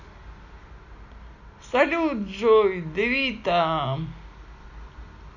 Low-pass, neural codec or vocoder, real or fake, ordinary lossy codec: 7.2 kHz; none; real; none